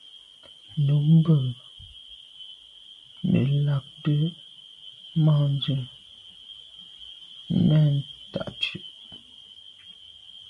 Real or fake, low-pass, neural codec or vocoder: real; 10.8 kHz; none